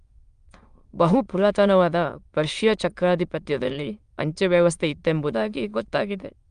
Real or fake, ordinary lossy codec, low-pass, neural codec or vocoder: fake; Opus, 32 kbps; 9.9 kHz; autoencoder, 22.05 kHz, a latent of 192 numbers a frame, VITS, trained on many speakers